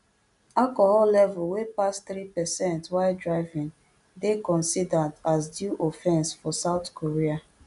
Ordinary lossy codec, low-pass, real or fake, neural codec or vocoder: none; 10.8 kHz; real; none